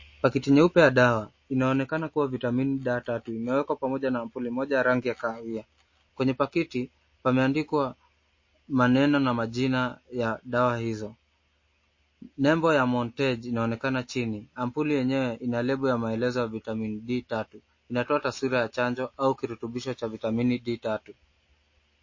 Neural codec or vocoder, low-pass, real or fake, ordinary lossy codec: none; 7.2 kHz; real; MP3, 32 kbps